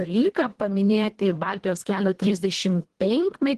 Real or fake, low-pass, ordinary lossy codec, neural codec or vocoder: fake; 10.8 kHz; Opus, 16 kbps; codec, 24 kHz, 1.5 kbps, HILCodec